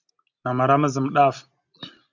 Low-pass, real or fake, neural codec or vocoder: 7.2 kHz; real; none